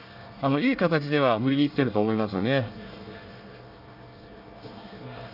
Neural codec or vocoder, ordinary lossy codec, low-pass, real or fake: codec, 24 kHz, 1 kbps, SNAC; none; 5.4 kHz; fake